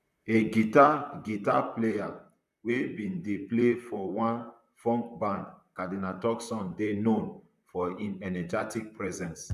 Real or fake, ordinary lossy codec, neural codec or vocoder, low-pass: fake; none; vocoder, 44.1 kHz, 128 mel bands, Pupu-Vocoder; 14.4 kHz